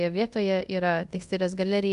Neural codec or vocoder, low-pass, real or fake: codec, 24 kHz, 0.5 kbps, DualCodec; 10.8 kHz; fake